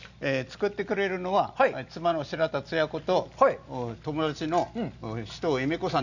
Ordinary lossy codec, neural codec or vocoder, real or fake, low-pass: none; none; real; 7.2 kHz